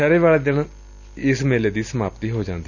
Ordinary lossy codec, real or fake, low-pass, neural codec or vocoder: none; real; 7.2 kHz; none